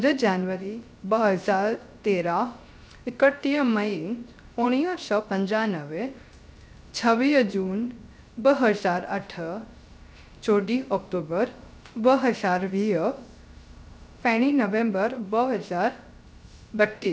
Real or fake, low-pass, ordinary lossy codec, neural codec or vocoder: fake; none; none; codec, 16 kHz, 0.3 kbps, FocalCodec